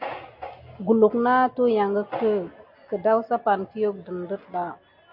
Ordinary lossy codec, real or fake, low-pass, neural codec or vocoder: MP3, 32 kbps; real; 5.4 kHz; none